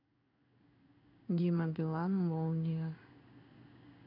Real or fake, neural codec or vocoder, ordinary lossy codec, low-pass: fake; codec, 16 kHz in and 24 kHz out, 1 kbps, XY-Tokenizer; none; 5.4 kHz